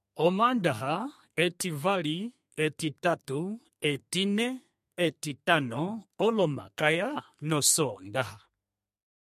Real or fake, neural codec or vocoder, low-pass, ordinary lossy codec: fake; codec, 32 kHz, 1.9 kbps, SNAC; 14.4 kHz; MP3, 64 kbps